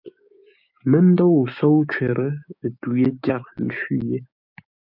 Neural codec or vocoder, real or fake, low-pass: codec, 16 kHz, 6 kbps, DAC; fake; 5.4 kHz